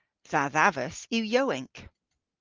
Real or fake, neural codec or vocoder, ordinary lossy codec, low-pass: real; none; Opus, 32 kbps; 7.2 kHz